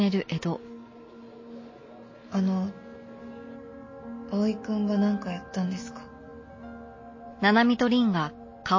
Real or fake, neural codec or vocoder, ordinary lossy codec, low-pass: real; none; MP3, 32 kbps; 7.2 kHz